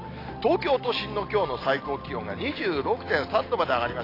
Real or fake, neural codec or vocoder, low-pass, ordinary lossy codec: real; none; 5.4 kHz; AAC, 24 kbps